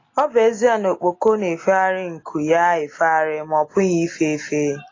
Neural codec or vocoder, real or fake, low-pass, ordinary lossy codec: none; real; 7.2 kHz; AAC, 32 kbps